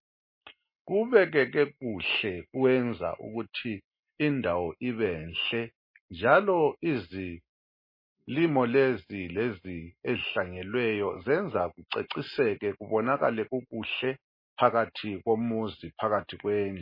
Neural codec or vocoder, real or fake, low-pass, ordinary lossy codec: none; real; 5.4 kHz; MP3, 24 kbps